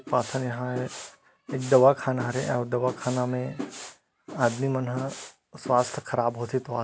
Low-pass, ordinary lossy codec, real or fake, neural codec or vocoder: none; none; real; none